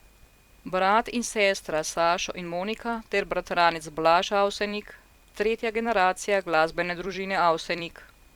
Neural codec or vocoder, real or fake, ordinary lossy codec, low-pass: vocoder, 44.1 kHz, 128 mel bands every 256 samples, BigVGAN v2; fake; Opus, 64 kbps; 19.8 kHz